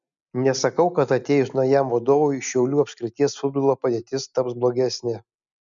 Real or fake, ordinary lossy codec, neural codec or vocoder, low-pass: real; MP3, 96 kbps; none; 7.2 kHz